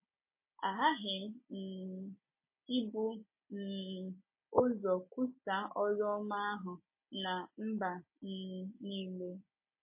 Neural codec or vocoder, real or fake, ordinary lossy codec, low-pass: none; real; MP3, 24 kbps; 3.6 kHz